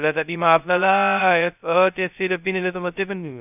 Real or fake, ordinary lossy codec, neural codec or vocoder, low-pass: fake; none; codec, 16 kHz, 0.2 kbps, FocalCodec; 3.6 kHz